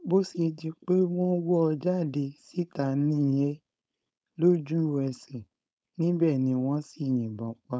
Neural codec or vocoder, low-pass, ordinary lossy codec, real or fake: codec, 16 kHz, 4.8 kbps, FACodec; none; none; fake